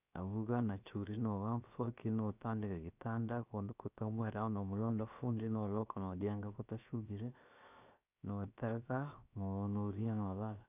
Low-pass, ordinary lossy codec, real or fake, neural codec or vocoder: 3.6 kHz; none; fake; codec, 16 kHz, 0.7 kbps, FocalCodec